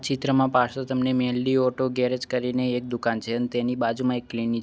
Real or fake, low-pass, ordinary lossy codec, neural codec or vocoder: real; none; none; none